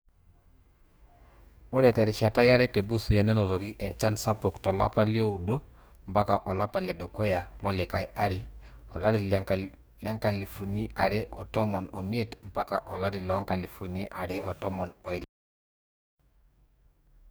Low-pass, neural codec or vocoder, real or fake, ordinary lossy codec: none; codec, 44.1 kHz, 2.6 kbps, DAC; fake; none